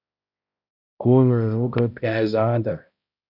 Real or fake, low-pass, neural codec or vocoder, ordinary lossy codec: fake; 5.4 kHz; codec, 16 kHz, 0.5 kbps, X-Codec, HuBERT features, trained on balanced general audio; AAC, 48 kbps